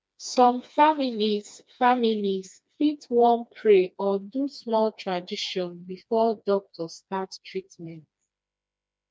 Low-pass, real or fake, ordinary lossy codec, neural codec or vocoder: none; fake; none; codec, 16 kHz, 2 kbps, FreqCodec, smaller model